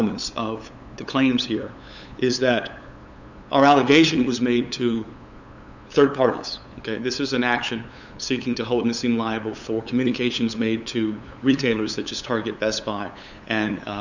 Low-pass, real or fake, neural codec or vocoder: 7.2 kHz; fake; codec, 16 kHz, 8 kbps, FunCodec, trained on LibriTTS, 25 frames a second